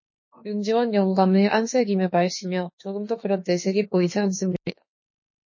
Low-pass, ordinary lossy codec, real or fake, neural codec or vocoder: 7.2 kHz; MP3, 32 kbps; fake; autoencoder, 48 kHz, 32 numbers a frame, DAC-VAE, trained on Japanese speech